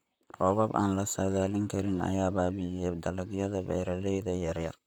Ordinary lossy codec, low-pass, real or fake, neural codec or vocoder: none; none; fake; vocoder, 44.1 kHz, 128 mel bands, Pupu-Vocoder